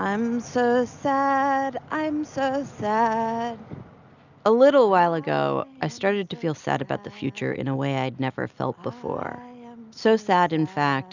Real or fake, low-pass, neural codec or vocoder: real; 7.2 kHz; none